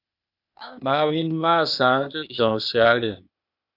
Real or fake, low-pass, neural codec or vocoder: fake; 5.4 kHz; codec, 16 kHz, 0.8 kbps, ZipCodec